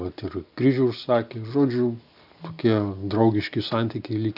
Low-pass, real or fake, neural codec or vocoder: 5.4 kHz; real; none